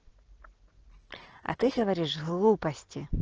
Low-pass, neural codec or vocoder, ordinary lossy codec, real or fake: 7.2 kHz; none; Opus, 16 kbps; real